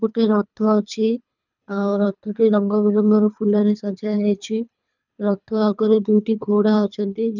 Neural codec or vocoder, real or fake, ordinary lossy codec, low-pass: codec, 24 kHz, 3 kbps, HILCodec; fake; none; 7.2 kHz